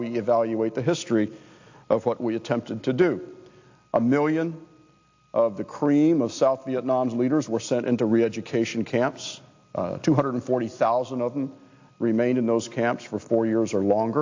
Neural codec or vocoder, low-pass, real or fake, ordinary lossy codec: none; 7.2 kHz; real; AAC, 48 kbps